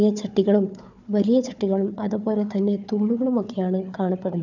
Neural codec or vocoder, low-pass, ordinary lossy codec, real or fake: codec, 16 kHz, 4 kbps, FunCodec, trained on Chinese and English, 50 frames a second; 7.2 kHz; none; fake